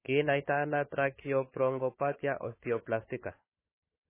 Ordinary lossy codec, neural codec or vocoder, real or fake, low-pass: MP3, 16 kbps; codec, 16 kHz, 4 kbps, FunCodec, trained on Chinese and English, 50 frames a second; fake; 3.6 kHz